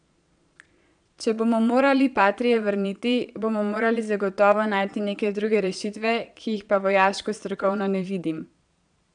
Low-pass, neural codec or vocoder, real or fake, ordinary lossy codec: 9.9 kHz; vocoder, 22.05 kHz, 80 mel bands, Vocos; fake; none